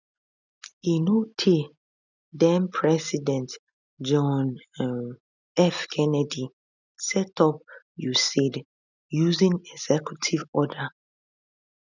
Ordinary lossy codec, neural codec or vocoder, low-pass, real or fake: none; none; 7.2 kHz; real